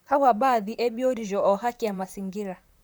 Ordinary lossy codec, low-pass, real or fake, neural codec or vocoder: none; none; fake; vocoder, 44.1 kHz, 128 mel bands, Pupu-Vocoder